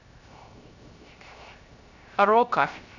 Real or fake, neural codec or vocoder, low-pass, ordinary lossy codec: fake; codec, 16 kHz, 0.3 kbps, FocalCodec; 7.2 kHz; none